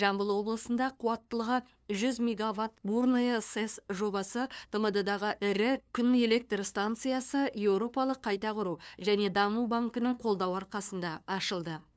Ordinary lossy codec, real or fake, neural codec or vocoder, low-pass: none; fake; codec, 16 kHz, 2 kbps, FunCodec, trained on LibriTTS, 25 frames a second; none